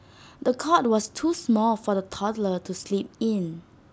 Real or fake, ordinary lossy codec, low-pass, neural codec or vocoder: real; none; none; none